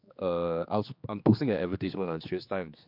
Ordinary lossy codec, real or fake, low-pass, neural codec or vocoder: MP3, 48 kbps; fake; 5.4 kHz; codec, 16 kHz, 2 kbps, X-Codec, HuBERT features, trained on general audio